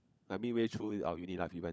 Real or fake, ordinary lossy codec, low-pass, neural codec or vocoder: fake; none; none; codec, 16 kHz, 16 kbps, FunCodec, trained on LibriTTS, 50 frames a second